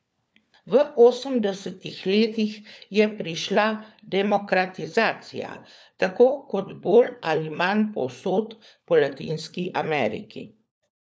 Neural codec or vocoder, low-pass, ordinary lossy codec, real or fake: codec, 16 kHz, 4 kbps, FunCodec, trained on LibriTTS, 50 frames a second; none; none; fake